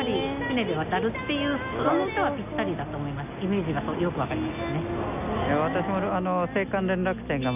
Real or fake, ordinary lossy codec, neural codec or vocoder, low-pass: real; none; none; 3.6 kHz